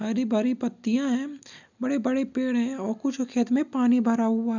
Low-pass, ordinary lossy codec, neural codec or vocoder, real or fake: 7.2 kHz; none; none; real